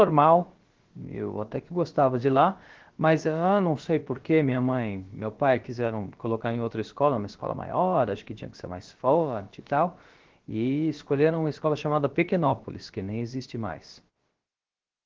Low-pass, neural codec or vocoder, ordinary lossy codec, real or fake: 7.2 kHz; codec, 16 kHz, about 1 kbps, DyCAST, with the encoder's durations; Opus, 16 kbps; fake